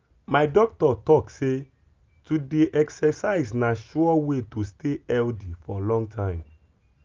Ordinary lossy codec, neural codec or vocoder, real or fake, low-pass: Opus, 32 kbps; none; real; 7.2 kHz